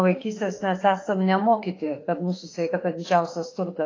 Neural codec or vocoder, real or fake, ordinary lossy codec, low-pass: autoencoder, 48 kHz, 32 numbers a frame, DAC-VAE, trained on Japanese speech; fake; AAC, 32 kbps; 7.2 kHz